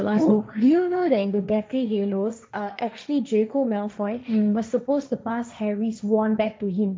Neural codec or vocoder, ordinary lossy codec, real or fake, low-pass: codec, 16 kHz, 1.1 kbps, Voila-Tokenizer; none; fake; none